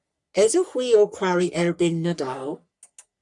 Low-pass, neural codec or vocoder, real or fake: 10.8 kHz; codec, 44.1 kHz, 3.4 kbps, Pupu-Codec; fake